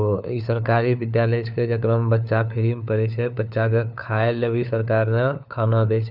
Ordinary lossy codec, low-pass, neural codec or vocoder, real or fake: none; 5.4 kHz; codec, 16 kHz, 4 kbps, FunCodec, trained on LibriTTS, 50 frames a second; fake